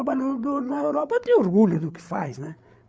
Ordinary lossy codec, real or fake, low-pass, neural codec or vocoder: none; fake; none; codec, 16 kHz, 8 kbps, FreqCodec, larger model